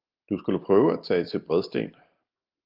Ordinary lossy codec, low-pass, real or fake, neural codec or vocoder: Opus, 32 kbps; 5.4 kHz; real; none